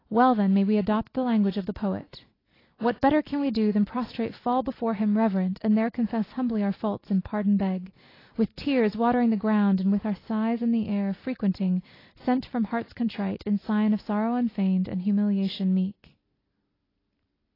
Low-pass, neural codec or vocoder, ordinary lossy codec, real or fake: 5.4 kHz; none; AAC, 24 kbps; real